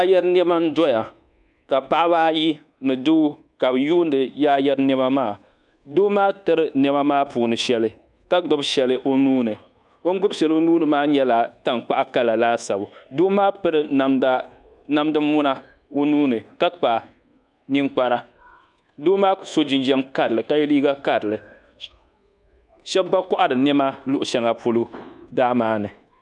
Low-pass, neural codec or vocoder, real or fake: 10.8 kHz; codec, 24 kHz, 1.2 kbps, DualCodec; fake